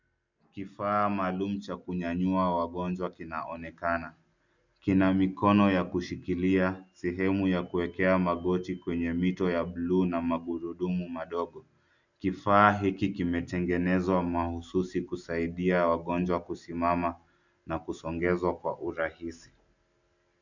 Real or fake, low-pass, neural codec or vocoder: real; 7.2 kHz; none